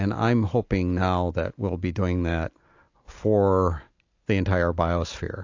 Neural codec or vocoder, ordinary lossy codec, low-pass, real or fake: none; AAC, 48 kbps; 7.2 kHz; real